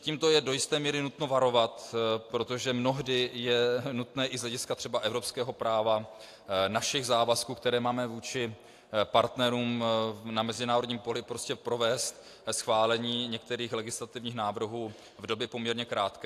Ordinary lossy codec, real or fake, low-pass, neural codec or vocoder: AAC, 64 kbps; real; 14.4 kHz; none